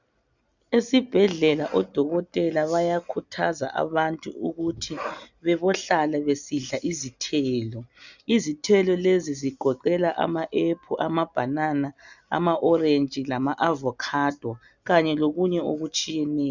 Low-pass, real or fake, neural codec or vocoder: 7.2 kHz; real; none